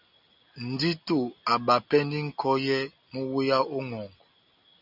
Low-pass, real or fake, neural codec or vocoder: 5.4 kHz; real; none